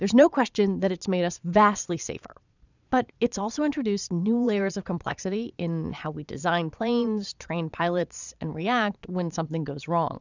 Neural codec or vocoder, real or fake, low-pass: vocoder, 22.05 kHz, 80 mel bands, Vocos; fake; 7.2 kHz